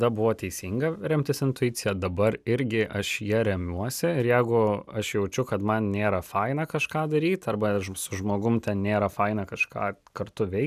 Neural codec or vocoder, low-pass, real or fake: none; 14.4 kHz; real